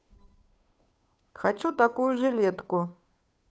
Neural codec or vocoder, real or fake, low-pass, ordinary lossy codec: codec, 16 kHz, 2 kbps, FunCodec, trained on Chinese and English, 25 frames a second; fake; none; none